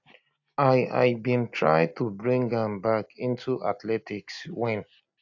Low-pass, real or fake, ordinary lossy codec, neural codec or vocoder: 7.2 kHz; real; AAC, 48 kbps; none